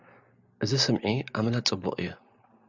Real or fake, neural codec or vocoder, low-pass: real; none; 7.2 kHz